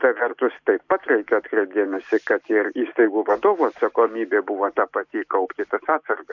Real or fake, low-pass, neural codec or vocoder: real; 7.2 kHz; none